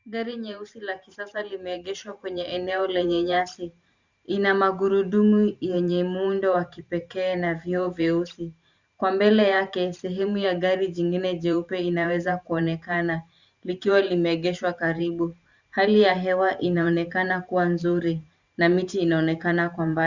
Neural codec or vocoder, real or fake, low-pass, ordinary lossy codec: vocoder, 44.1 kHz, 128 mel bands every 512 samples, BigVGAN v2; fake; 7.2 kHz; Opus, 64 kbps